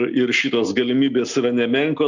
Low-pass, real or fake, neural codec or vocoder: 7.2 kHz; real; none